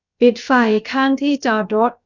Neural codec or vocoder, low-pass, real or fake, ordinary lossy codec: codec, 16 kHz, about 1 kbps, DyCAST, with the encoder's durations; 7.2 kHz; fake; none